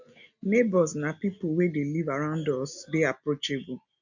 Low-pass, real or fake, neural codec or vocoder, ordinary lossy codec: 7.2 kHz; real; none; none